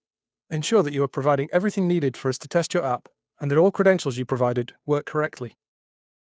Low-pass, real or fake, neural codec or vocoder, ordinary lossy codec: none; fake; codec, 16 kHz, 2 kbps, FunCodec, trained on Chinese and English, 25 frames a second; none